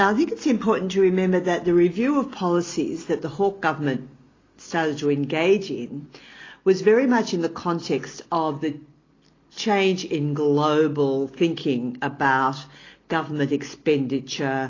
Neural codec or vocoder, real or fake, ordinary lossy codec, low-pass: none; real; AAC, 32 kbps; 7.2 kHz